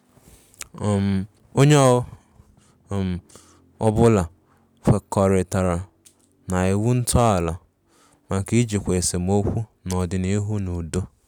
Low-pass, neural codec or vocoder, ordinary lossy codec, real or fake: 19.8 kHz; none; none; real